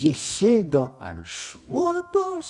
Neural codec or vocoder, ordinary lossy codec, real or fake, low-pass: codec, 24 kHz, 0.9 kbps, WavTokenizer, medium music audio release; Opus, 64 kbps; fake; 10.8 kHz